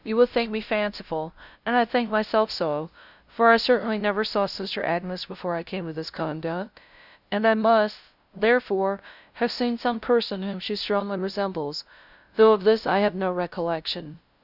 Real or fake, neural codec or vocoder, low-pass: fake; codec, 16 kHz, 0.5 kbps, FunCodec, trained on LibriTTS, 25 frames a second; 5.4 kHz